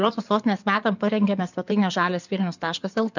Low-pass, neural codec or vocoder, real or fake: 7.2 kHz; codec, 16 kHz in and 24 kHz out, 2.2 kbps, FireRedTTS-2 codec; fake